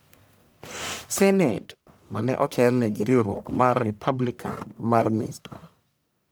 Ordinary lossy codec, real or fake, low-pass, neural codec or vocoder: none; fake; none; codec, 44.1 kHz, 1.7 kbps, Pupu-Codec